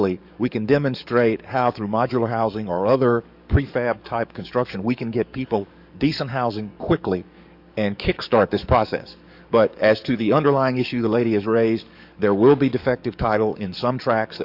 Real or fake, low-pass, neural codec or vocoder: fake; 5.4 kHz; codec, 44.1 kHz, 7.8 kbps, DAC